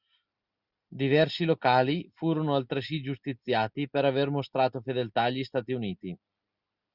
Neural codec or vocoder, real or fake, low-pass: none; real; 5.4 kHz